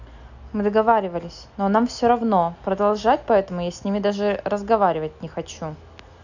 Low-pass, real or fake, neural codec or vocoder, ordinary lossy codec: 7.2 kHz; real; none; none